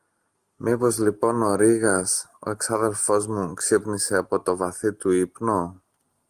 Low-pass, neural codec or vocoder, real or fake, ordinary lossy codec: 14.4 kHz; none; real; Opus, 24 kbps